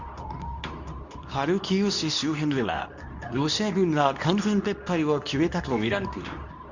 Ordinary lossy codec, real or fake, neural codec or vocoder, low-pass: none; fake; codec, 24 kHz, 0.9 kbps, WavTokenizer, medium speech release version 2; 7.2 kHz